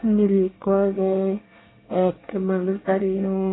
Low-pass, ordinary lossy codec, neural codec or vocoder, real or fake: 7.2 kHz; AAC, 16 kbps; codec, 24 kHz, 1 kbps, SNAC; fake